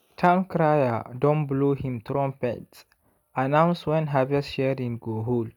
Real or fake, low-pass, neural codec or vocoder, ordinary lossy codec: real; 19.8 kHz; none; none